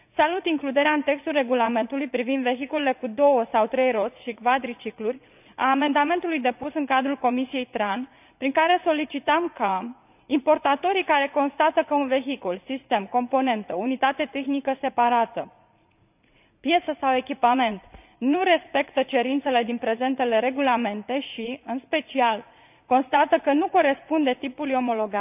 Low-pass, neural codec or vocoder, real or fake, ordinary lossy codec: 3.6 kHz; vocoder, 44.1 kHz, 80 mel bands, Vocos; fake; none